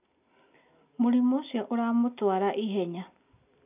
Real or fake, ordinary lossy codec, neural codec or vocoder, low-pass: real; none; none; 3.6 kHz